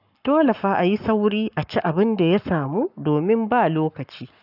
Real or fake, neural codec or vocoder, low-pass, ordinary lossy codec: fake; codec, 44.1 kHz, 7.8 kbps, Pupu-Codec; 5.4 kHz; none